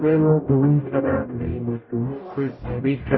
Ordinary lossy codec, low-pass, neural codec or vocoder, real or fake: MP3, 24 kbps; 7.2 kHz; codec, 44.1 kHz, 0.9 kbps, DAC; fake